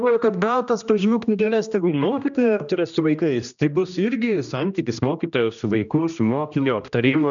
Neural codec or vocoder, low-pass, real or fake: codec, 16 kHz, 1 kbps, X-Codec, HuBERT features, trained on general audio; 7.2 kHz; fake